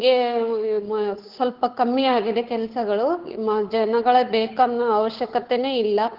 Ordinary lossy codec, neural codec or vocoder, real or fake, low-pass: Opus, 16 kbps; codec, 16 kHz, 8 kbps, FunCodec, trained on Chinese and English, 25 frames a second; fake; 5.4 kHz